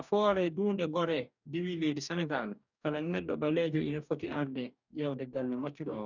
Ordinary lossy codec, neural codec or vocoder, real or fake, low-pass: none; codec, 44.1 kHz, 2.6 kbps, DAC; fake; 7.2 kHz